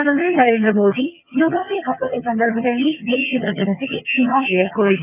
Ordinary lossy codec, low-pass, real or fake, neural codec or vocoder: none; 3.6 kHz; fake; vocoder, 22.05 kHz, 80 mel bands, HiFi-GAN